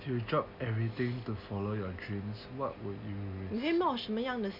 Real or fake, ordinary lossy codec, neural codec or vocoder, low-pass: real; MP3, 48 kbps; none; 5.4 kHz